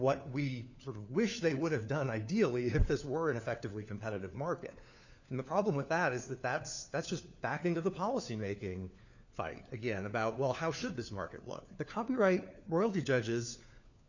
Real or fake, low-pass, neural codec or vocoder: fake; 7.2 kHz; codec, 16 kHz, 4 kbps, FunCodec, trained on LibriTTS, 50 frames a second